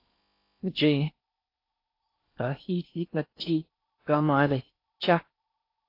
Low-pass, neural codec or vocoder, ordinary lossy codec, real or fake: 5.4 kHz; codec, 16 kHz in and 24 kHz out, 0.6 kbps, FocalCodec, streaming, 4096 codes; AAC, 32 kbps; fake